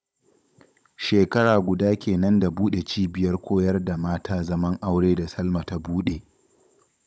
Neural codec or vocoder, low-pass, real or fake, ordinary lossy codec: codec, 16 kHz, 16 kbps, FunCodec, trained on Chinese and English, 50 frames a second; none; fake; none